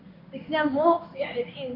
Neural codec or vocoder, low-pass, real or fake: codec, 16 kHz in and 24 kHz out, 1 kbps, XY-Tokenizer; 5.4 kHz; fake